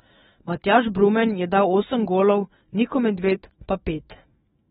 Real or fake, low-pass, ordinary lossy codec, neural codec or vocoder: fake; 19.8 kHz; AAC, 16 kbps; autoencoder, 48 kHz, 128 numbers a frame, DAC-VAE, trained on Japanese speech